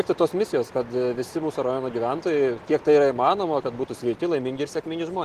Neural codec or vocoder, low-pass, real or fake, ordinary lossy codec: none; 14.4 kHz; real; Opus, 16 kbps